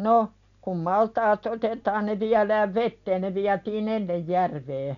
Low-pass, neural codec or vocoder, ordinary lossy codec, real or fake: 7.2 kHz; none; none; real